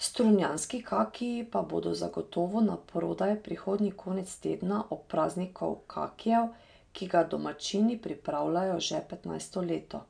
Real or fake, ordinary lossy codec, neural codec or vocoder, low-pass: real; none; none; 9.9 kHz